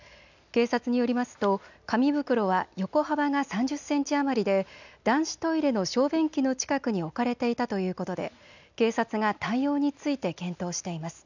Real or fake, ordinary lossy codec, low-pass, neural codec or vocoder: real; none; 7.2 kHz; none